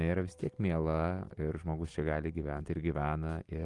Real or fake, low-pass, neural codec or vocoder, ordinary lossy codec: real; 10.8 kHz; none; Opus, 24 kbps